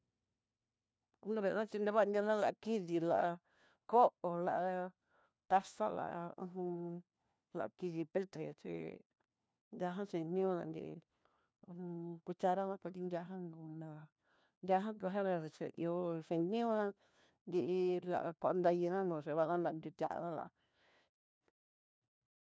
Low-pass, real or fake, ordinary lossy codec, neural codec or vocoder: none; fake; none; codec, 16 kHz, 1 kbps, FunCodec, trained on LibriTTS, 50 frames a second